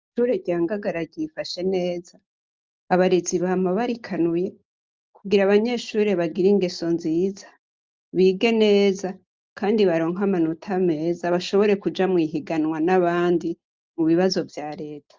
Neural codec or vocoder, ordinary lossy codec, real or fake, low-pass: none; Opus, 24 kbps; real; 7.2 kHz